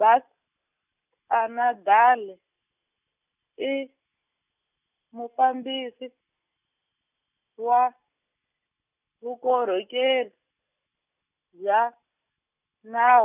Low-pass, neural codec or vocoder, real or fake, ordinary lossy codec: 3.6 kHz; none; real; none